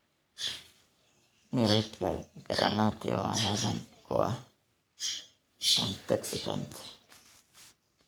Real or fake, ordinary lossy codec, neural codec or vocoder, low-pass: fake; none; codec, 44.1 kHz, 3.4 kbps, Pupu-Codec; none